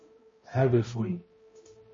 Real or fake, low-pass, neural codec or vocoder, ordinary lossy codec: fake; 7.2 kHz; codec, 16 kHz, 0.5 kbps, X-Codec, HuBERT features, trained on balanced general audio; MP3, 32 kbps